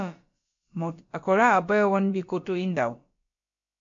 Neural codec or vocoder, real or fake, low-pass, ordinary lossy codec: codec, 16 kHz, about 1 kbps, DyCAST, with the encoder's durations; fake; 7.2 kHz; MP3, 48 kbps